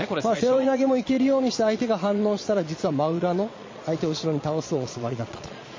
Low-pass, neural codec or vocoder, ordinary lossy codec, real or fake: 7.2 kHz; vocoder, 22.05 kHz, 80 mel bands, Vocos; MP3, 32 kbps; fake